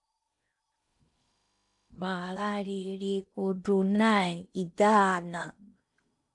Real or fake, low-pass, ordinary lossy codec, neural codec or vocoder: fake; 10.8 kHz; AAC, 64 kbps; codec, 16 kHz in and 24 kHz out, 0.8 kbps, FocalCodec, streaming, 65536 codes